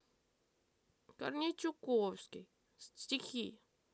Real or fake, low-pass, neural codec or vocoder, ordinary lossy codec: real; none; none; none